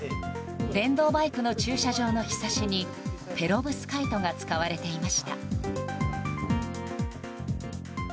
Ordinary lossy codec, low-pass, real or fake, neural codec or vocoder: none; none; real; none